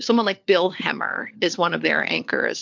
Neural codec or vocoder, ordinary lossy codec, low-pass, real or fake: codec, 16 kHz, 4 kbps, FunCodec, trained on LibriTTS, 50 frames a second; MP3, 64 kbps; 7.2 kHz; fake